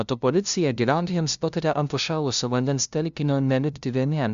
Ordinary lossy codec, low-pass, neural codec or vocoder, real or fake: Opus, 64 kbps; 7.2 kHz; codec, 16 kHz, 0.5 kbps, FunCodec, trained on LibriTTS, 25 frames a second; fake